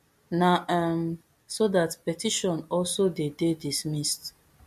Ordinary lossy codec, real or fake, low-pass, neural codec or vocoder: MP3, 64 kbps; real; 14.4 kHz; none